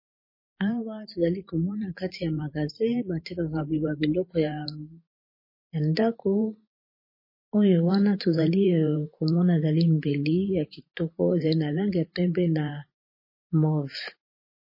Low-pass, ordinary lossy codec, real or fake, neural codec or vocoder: 5.4 kHz; MP3, 24 kbps; fake; vocoder, 44.1 kHz, 128 mel bands every 512 samples, BigVGAN v2